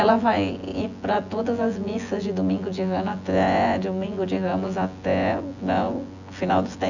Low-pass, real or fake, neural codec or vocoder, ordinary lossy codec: 7.2 kHz; fake; vocoder, 24 kHz, 100 mel bands, Vocos; none